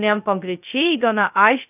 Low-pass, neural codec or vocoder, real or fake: 3.6 kHz; codec, 16 kHz, 0.2 kbps, FocalCodec; fake